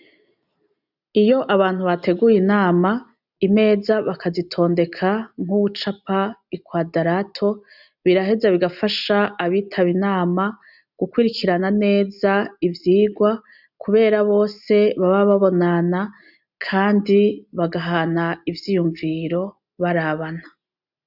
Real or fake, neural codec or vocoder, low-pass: real; none; 5.4 kHz